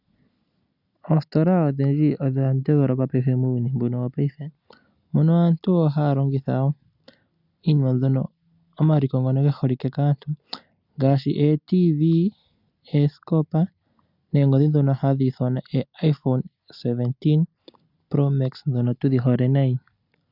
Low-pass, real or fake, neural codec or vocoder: 5.4 kHz; real; none